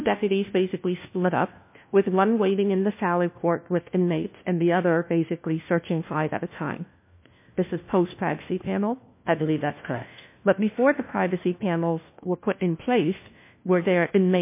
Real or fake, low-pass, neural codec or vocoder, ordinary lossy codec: fake; 3.6 kHz; codec, 16 kHz, 1 kbps, FunCodec, trained on LibriTTS, 50 frames a second; MP3, 24 kbps